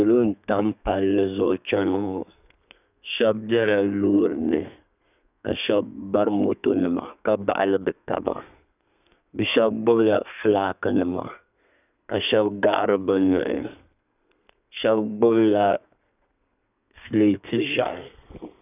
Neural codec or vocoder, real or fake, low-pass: codec, 32 kHz, 1.9 kbps, SNAC; fake; 3.6 kHz